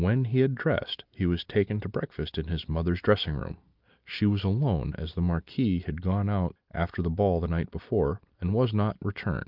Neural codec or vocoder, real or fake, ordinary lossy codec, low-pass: none; real; Opus, 24 kbps; 5.4 kHz